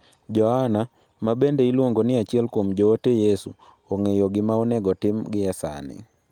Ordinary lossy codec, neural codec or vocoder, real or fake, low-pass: Opus, 32 kbps; none; real; 19.8 kHz